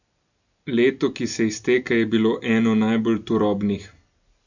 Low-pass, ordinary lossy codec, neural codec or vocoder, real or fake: 7.2 kHz; none; none; real